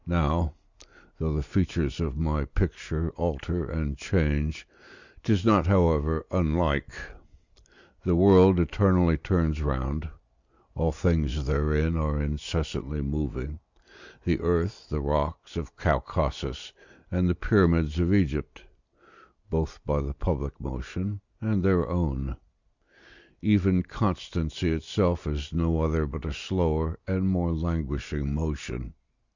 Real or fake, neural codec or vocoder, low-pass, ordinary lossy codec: real; none; 7.2 kHz; Opus, 64 kbps